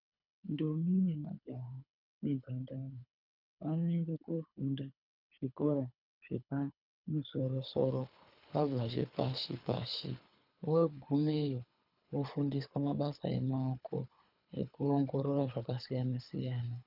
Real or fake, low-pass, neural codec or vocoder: fake; 5.4 kHz; codec, 24 kHz, 3 kbps, HILCodec